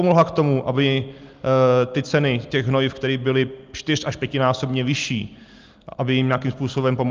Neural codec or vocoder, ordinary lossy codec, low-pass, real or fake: none; Opus, 32 kbps; 7.2 kHz; real